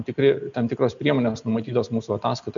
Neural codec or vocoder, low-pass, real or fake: none; 7.2 kHz; real